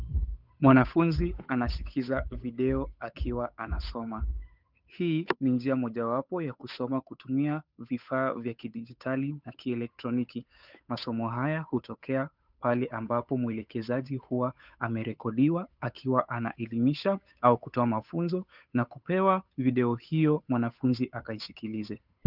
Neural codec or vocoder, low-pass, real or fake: codec, 16 kHz, 8 kbps, FunCodec, trained on Chinese and English, 25 frames a second; 5.4 kHz; fake